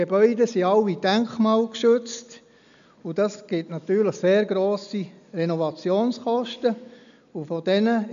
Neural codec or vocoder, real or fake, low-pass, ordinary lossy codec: none; real; 7.2 kHz; none